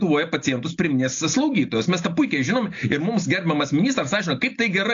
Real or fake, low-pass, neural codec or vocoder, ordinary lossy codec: real; 7.2 kHz; none; AAC, 48 kbps